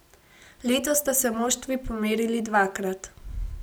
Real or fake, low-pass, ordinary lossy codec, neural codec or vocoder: real; none; none; none